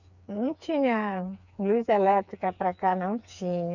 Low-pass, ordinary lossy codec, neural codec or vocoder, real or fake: 7.2 kHz; none; codec, 16 kHz, 4 kbps, FreqCodec, smaller model; fake